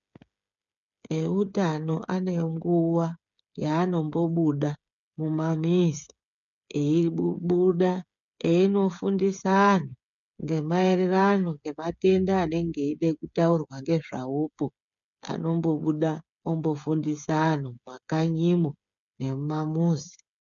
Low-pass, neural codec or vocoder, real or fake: 7.2 kHz; codec, 16 kHz, 8 kbps, FreqCodec, smaller model; fake